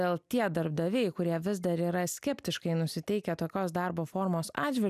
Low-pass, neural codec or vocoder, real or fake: 14.4 kHz; none; real